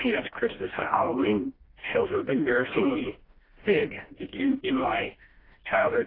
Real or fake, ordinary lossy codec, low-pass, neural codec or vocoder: fake; AAC, 24 kbps; 5.4 kHz; codec, 16 kHz, 1 kbps, FreqCodec, smaller model